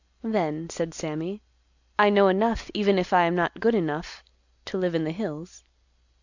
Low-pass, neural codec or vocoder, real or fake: 7.2 kHz; none; real